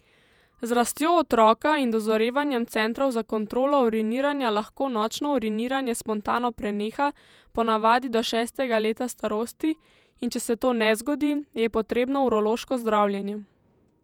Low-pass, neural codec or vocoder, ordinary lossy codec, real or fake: 19.8 kHz; vocoder, 48 kHz, 128 mel bands, Vocos; none; fake